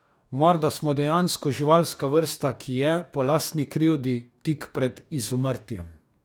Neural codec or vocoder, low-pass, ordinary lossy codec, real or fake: codec, 44.1 kHz, 2.6 kbps, DAC; none; none; fake